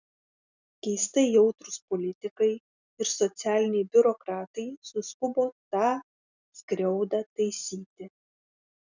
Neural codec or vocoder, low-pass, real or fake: none; 7.2 kHz; real